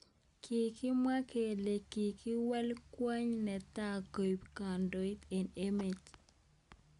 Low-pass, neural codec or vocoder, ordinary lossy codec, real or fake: 10.8 kHz; none; AAC, 96 kbps; real